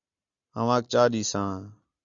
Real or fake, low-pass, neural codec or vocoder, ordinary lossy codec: real; 7.2 kHz; none; Opus, 64 kbps